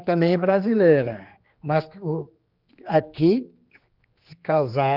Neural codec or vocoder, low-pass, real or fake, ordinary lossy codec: codec, 16 kHz, 2 kbps, X-Codec, HuBERT features, trained on general audio; 5.4 kHz; fake; Opus, 24 kbps